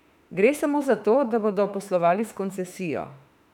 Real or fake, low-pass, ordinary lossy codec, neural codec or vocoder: fake; 19.8 kHz; none; autoencoder, 48 kHz, 32 numbers a frame, DAC-VAE, trained on Japanese speech